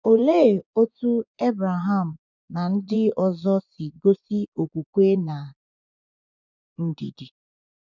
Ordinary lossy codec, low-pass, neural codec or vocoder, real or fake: none; 7.2 kHz; vocoder, 24 kHz, 100 mel bands, Vocos; fake